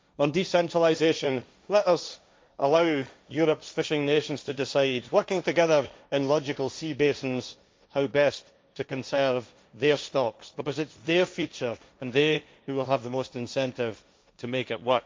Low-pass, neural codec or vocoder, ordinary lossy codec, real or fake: none; codec, 16 kHz, 1.1 kbps, Voila-Tokenizer; none; fake